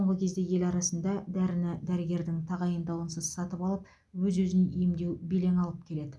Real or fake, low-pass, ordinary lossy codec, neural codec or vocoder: real; none; none; none